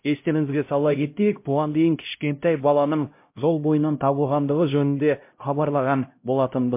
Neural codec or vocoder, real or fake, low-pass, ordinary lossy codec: codec, 16 kHz, 0.5 kbps, X-Codec, HuBERT features, trained on LibriSpeech; fake; 3.6 kHz; MP3, 24 kbps